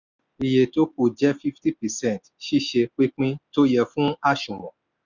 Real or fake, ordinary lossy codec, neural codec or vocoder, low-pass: real; none; none; 7.2 kHz